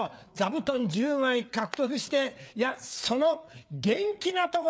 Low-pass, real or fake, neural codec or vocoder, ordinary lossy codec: none; fake; codec, 16 kHz, 4 kbps, FreqCodec, larger model; none